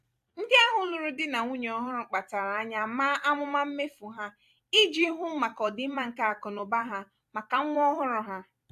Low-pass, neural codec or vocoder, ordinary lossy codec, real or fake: 14.4 kHz; vocoder, 44.1 kHz, 128 mel bands every 256 samples, BigVGAN v2; AAC, 96 kbps; fake